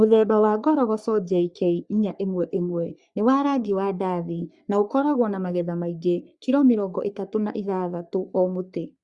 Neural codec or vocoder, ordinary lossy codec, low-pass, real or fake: codec, 44.1 kHz, 3.4 kbps, Pupu-Codec; Opus, 64 kbps; 10.8 kHz; fake